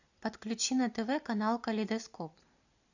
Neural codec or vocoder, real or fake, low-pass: vocoder, 44.1 kHz, 128 mel bands every 256 samples, BigVGAN v2; fake; 7.2 kHz